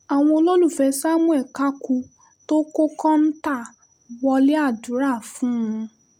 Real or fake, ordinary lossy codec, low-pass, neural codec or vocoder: real; none; 19.8 kHz; none